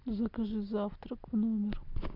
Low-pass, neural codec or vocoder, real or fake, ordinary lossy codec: 5.4 kHz; none; real; MP3, 48 kbps